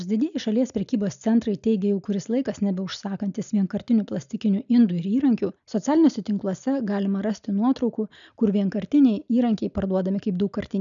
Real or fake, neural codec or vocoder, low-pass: real; none; 7.2 kHz